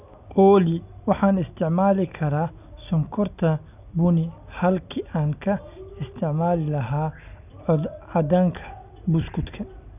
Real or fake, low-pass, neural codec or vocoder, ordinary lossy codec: real; 3.6 kHz; none; none